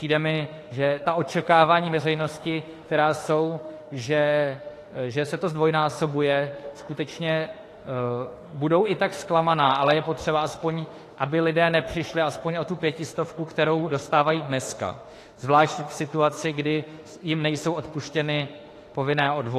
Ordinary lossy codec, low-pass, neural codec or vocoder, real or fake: AAC, 48 kbps; 14.4 kHz; autoencoder, 48 kHz, 32 numbers a frame, DAC-VAE, trained on Japanese speech; fake